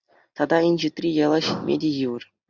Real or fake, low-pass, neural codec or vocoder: real; 7.2 kHz; none